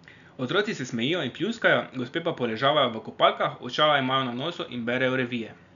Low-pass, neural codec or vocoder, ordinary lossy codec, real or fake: 7.2 kHz; none; none; real